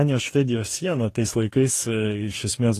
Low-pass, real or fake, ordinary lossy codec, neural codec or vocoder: 14.4 kHz; fake; AAC, 48 kbps; codec, 44.1 kHz, 2.6 kbps, DAC